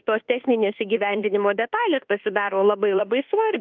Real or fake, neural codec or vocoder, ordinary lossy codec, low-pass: fake; codec, 24 kHz, 1.2 kbps, DualCodec; Opus, 24 kbps; 7.2 kHz